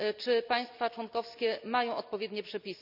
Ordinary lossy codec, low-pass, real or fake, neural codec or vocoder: none; 5.4 kHz; real; none